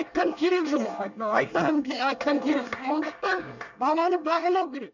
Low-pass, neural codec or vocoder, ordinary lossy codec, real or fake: 7.2 kHz; codec, 24 kHz, 1 kbps, SNAC; none; fake